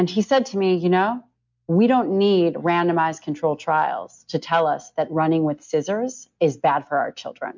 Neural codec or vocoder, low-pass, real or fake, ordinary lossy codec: none; 7.2 kHz; real; MP3, 64 kbps